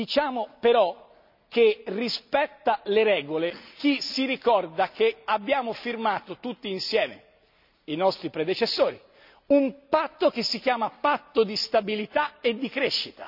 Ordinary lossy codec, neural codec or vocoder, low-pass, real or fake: none; none; 5.4 kHz; real